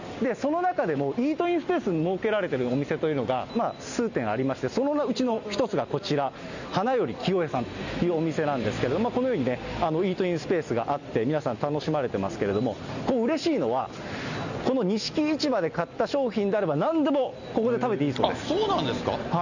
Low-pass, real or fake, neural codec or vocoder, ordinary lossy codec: 7.2 kHz; real; none; none